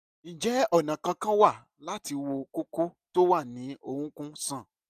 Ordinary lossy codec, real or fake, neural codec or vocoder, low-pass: none; real; none; 14.4 kHz